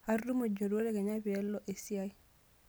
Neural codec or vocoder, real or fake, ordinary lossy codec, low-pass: none; real; none; none